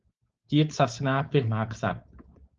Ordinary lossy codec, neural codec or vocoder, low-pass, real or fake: Opus, 24 kbps; codec, 16 kHz, 4.8 kbps, FACodec; 7.2 kHz; fake